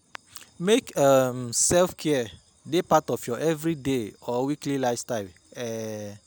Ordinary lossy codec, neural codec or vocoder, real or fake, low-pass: none; none; real; none